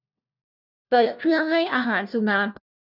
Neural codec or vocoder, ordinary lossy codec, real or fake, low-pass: codec, 16 kHz, 1 kbps, FunCodec, trained on LibriTTS, 50 frames a second; none; fake; 5.4 kHz